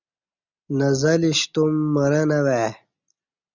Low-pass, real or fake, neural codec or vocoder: 7.2 kHz; real; none